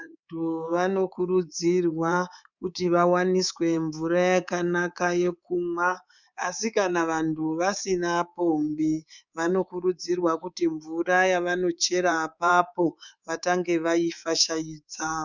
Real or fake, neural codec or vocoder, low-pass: fake; codec, 24 kHz, 3.1 kbps, DualCodec; 7.2 kHz